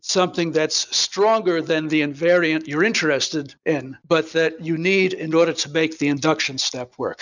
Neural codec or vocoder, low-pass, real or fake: none; 7.2 kHz; real